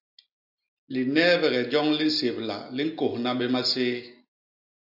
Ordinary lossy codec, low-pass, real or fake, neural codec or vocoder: AAC, 48 kbps; 5.4 kHz; real; none